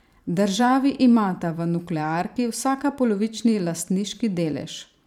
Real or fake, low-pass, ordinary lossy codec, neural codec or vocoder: fake; 19.8 kHz; none; vocoder, 44.1 kHz, 128 mel bands every 512 samples, BigVGAN v2